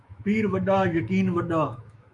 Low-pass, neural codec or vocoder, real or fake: 10.8 kHz; autoencoder, 48 kHz, 128 numbers a frame, DAC-VAE, trained on Japanese speech; fake